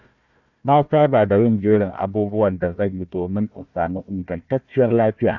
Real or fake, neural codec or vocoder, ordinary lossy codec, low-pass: fake; codec, 16 kHz, 1 kbps, FunCodec, trained on Chinese and English, 50 frames a second; none; 7.2 kHz